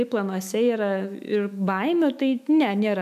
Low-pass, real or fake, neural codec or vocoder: 14.4 kHz; fake; autoencoder, 48 kHz, 128 numbers a frame, DAC-VAE, trained on Japanese speech